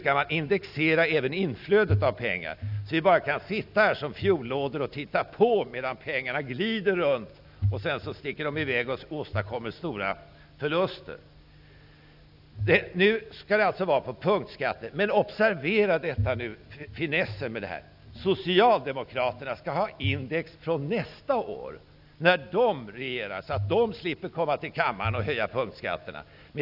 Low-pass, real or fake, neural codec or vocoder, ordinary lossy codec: 5.4 kHz; real; none; none